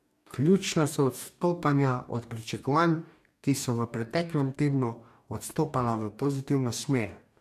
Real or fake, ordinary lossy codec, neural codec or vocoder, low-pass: fake; MP3, 96 kbps; codec, 44.1 kHz, 2.6 kbps, DAC; 14.4 kHz